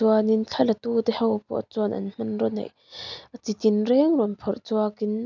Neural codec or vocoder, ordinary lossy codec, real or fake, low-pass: none; none; real; 7.2 kHz